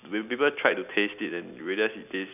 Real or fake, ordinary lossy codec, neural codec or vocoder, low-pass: real; none; none; 3.6 kHz